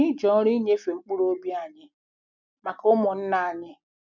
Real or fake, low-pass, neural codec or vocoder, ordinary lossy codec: real; 7.2 kHz; none; none